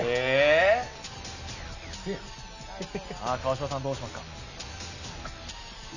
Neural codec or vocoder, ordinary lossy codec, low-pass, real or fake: none; none; 7.2 kHz; real